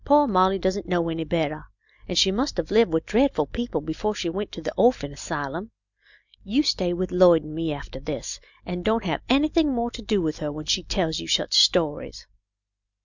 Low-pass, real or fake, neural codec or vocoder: 7.2 kHz; real; none